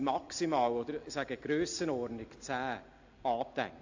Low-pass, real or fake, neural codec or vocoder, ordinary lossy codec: 7.2 kHz; real; none; AAC, 48 kbps